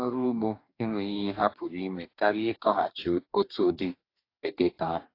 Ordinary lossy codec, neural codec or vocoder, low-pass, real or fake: AAC, 32 kbps; codec, 44.1 kHz, 2.6 kbps, DAC; 5.4 kHz; fake